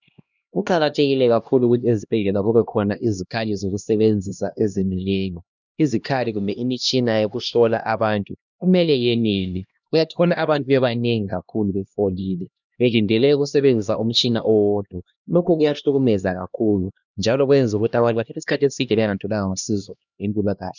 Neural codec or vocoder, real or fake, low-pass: codec, 16 kHz, 1 kbps, X-Codec, HuBERT features, trained on LibriSpeech; fake; 7.2 kHz